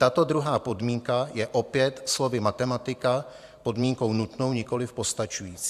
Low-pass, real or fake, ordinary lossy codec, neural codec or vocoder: 14.4 kHz; fake; AAC, 96 kbps; vocoder, 44.1 kHz, 128 mel bands every 512 samples, BigVGAN v2